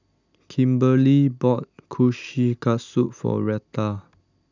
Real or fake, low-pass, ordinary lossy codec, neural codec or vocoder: real; 7.2 kHz; none; none